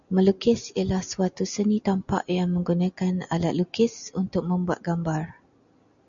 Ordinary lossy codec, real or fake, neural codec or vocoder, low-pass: MP3, 96 kbps; real; none; 7.2 kHz